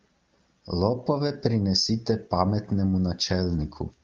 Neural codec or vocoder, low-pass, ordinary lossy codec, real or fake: none; 7.2 kHz; Opus, 24 kbps; real